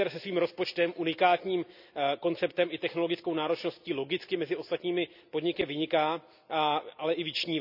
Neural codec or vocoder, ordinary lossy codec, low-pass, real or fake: none; none; 5.4 kHz; real